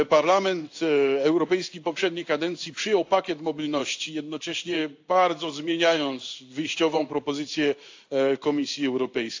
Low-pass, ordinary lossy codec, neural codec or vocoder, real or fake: 7.2 kHz; AAC, 48 kbps; codec, 16 kHz in and 24 kHz out, 1 kbps, XY-Tokenizer; fake